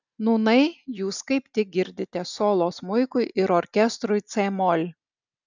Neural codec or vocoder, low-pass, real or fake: none; 7.2 kHz; real